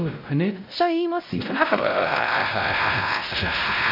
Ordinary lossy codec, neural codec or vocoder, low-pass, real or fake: MP3, 48 kbps; codec, 16 kHz, 0.5 kbps, X-Codec, WavLM features, trained on Multilingual LibriSpeech; 5.4 kHz; fake